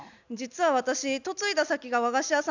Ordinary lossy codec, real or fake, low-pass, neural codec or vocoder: none; real; 7.2 kHz; none